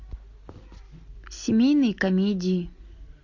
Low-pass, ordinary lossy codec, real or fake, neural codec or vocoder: 7.2 kHz; AAC, 48 kbps; real; none